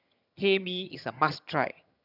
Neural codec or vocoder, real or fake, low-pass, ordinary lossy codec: vocoder, 22.05 kHz, 80 mel bands, HiFi-GAN; fake; 5.4 kHz; none